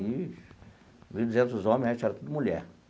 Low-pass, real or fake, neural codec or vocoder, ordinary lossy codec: none; real; none; none